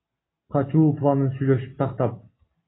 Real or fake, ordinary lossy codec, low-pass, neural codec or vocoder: real; AAC, 16 kbps; 7.2 kHz; none